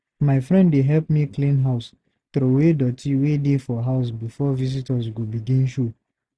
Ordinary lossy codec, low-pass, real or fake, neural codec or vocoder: none; none; real; none